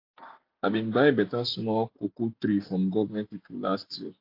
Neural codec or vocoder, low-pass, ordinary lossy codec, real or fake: none; 5.4 kHz; AAC, 32 kbps; real